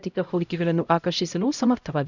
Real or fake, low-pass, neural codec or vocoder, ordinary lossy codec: fake; 7.2 kHz; codec, 16 kHz, 0.5 kbps, X-Codec, HuBERT features, trained on LibriSpeech; none